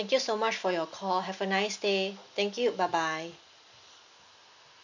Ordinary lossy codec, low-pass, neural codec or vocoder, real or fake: none; 7.2 kHz; none; real